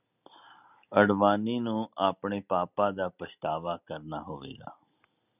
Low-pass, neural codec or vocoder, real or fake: 3.6 kHz; none; real